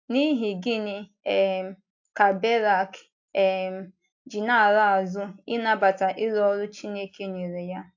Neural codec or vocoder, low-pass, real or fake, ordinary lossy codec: none; 7.2 kHz; real; AAC, 48 kbps